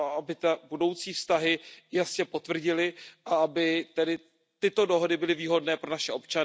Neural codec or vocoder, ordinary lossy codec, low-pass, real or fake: none; none; none; real